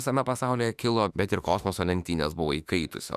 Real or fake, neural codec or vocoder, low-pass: fake; autoencoder, 48 kHz, 32 numbers a frame, DAC-VAE, trained on Japanese speech; 14.4 kHz